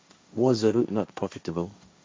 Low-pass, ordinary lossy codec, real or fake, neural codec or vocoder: none; none; fake; codec, 16 kHz, 1.1 kbps, Voila-Tokenizer